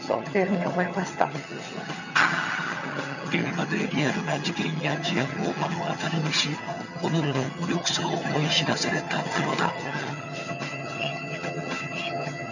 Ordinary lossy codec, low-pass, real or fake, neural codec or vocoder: none; 7.2 kHz; fake; vocoder, 22.05 kHz, 80 mel bands, HiFi-GAN